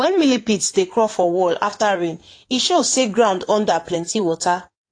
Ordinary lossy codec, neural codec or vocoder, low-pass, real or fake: AAC, 48 kbps; codec, 16 kHz in and 24 kHz out, 2.2 kbps, FireRedTTS-2 codec; 9.9 kHz; fake